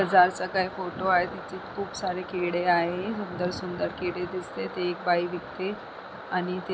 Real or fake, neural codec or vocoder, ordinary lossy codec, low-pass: real; none; none; none